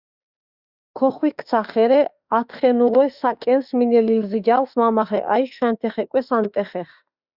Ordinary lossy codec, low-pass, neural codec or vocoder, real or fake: Opus, 64 kbps; 5.4 kHz; autoencoder, 48 kHz, 32 numbers a frame, DAC-VAE, trained on Japanese speech; fake